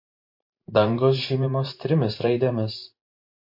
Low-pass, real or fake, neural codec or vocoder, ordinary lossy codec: 5.4 kHz; fake; vocoder, 44.1 kHz, 128 mel bands every 512 samples, BigVGAN v2; MP3, 32 kbps